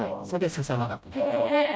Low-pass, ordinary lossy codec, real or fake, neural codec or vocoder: none; none; fake; codec, 16 kHz, 0.5 kbps, FreqCodec, smaller model